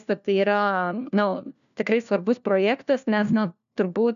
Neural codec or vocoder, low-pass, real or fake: codec, 16 kHz, 1 kbps, FunCodec, trained on LibriTTS, 50 frames a second; 7.2 kHz; fake